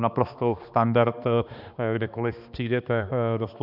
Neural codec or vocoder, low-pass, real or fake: codec, 16 kHz, 2 kbps, X-Codec, HuBERT features, trained on balanced general audio; 5.4 kHz; fake